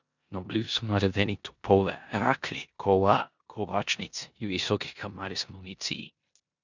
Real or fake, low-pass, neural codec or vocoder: fake; 7.2 kHz; codec, 16 kHz in and 24 kHz out, 0.9 kbps, LongCat-Audio-Codec, four codebook decoder